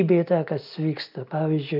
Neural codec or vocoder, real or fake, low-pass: none; real; 5.4 kHz